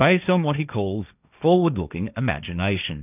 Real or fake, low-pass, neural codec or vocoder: fake; 3.6 kHz; codec, 16 kHz, 1.1 kbps, Voila-Tokenizer